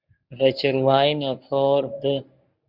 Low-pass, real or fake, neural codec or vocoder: 5.4 kHz; fake; codec, 24 kHz, 0.9 kbps, WavTokenizer, medium speech release version 1